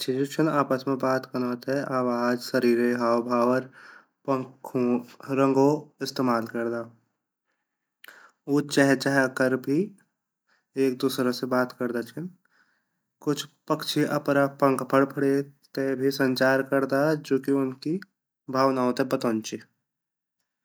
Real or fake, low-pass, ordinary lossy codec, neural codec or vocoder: real; none; none; none